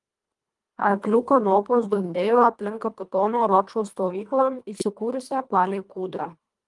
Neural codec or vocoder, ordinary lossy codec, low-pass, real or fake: codec, 24 kHz, 1.5 kbps, HILCodec; Opus, 32 kbps; 10.8 kHz; fake